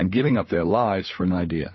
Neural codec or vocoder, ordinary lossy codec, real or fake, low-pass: codec, 16 kHz, 4 kbps, FunCodec, trained on LibriTTS, 50 frames a second; MP3, 24 kbps; fake; 7.2 kHz